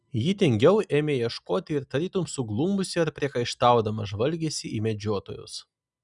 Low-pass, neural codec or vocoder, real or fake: 10.8 kHz; none; real